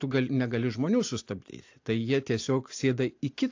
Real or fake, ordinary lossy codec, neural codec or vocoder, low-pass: real; AAC, 48 kbps; none; 7.2 kHz